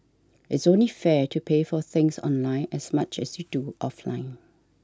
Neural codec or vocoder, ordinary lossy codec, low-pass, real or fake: none; none; none; real